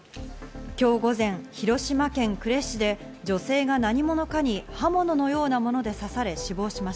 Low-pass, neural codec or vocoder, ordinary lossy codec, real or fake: none; none; none; real